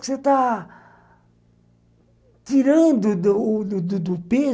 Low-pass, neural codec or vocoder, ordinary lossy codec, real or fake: none; none; none; real